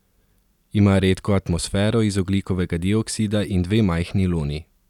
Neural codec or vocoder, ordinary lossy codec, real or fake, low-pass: none; none; real; 19.8 kHz